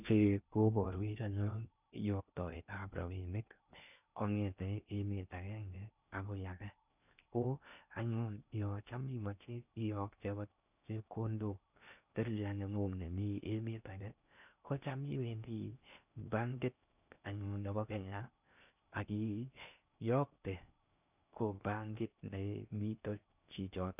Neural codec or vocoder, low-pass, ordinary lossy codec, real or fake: codec, 16 kHz in and 24 kHz out, 0.6 kbps, FocalCodec, streaming, 2048 codes; 3.6 kHz; none; fake